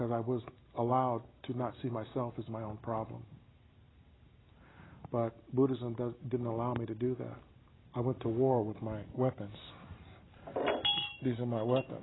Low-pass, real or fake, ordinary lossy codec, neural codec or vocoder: 7.2 kHz; real; AAC, 16 kbps; none